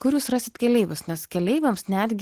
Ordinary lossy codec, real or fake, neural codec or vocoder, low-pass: Opus, 16 kbps; real; none; 14.4 kHz